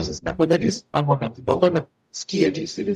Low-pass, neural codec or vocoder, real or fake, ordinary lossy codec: 14.4 kHz; codec, 44.1 kHz, 0.9 kbps, DAC; fake; MP3, 96 kbps